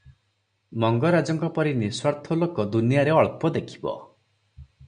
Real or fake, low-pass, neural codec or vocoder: real; 9.9 kHz; none